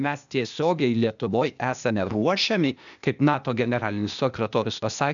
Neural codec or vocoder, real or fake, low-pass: codec, 16 kHz, 0.8 kbps, ZipCodec; fake; 7.2 kHz